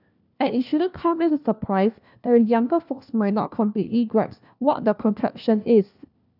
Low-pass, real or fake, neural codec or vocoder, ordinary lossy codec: 5.4 kHz; fake; codec, 16 kHz, 1 kbps, FunCodec, trained on LibriTTS, 50 frames a second; none